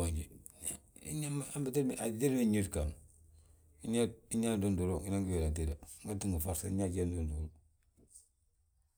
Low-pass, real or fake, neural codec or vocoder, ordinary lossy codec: none; real; none; none